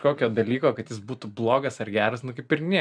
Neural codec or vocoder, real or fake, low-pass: none; real; 9.9 kHz